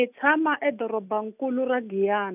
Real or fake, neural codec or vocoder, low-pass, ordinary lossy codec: real; none; 3.6 kHz; none